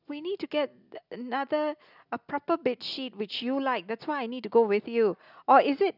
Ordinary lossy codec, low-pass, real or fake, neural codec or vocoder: none; 5.4 kHz; real; none